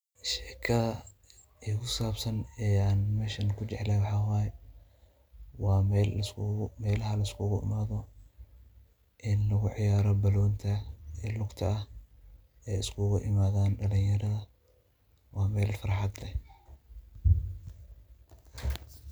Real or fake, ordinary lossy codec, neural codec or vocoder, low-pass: real; none; none; none